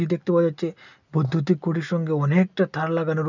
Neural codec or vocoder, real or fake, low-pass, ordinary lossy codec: none; real; 7.2 kHz; none